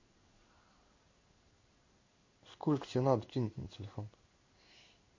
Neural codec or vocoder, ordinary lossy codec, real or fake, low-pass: codec, 16 kHz in and 24 kHz out, 1 kbps, XY-Tokenizer; MP3, 32 kbps; fake; 7.2 kHz